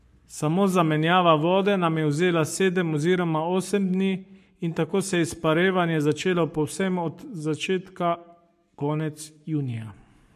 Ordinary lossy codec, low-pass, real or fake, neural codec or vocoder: MP3, 64 kbps; 14.4 kHz; fake; codec, 44.1 kHz, 7.8 kbps, Pupu-Codec